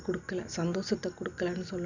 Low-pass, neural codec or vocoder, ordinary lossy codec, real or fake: 7.2 kHz; none; none; real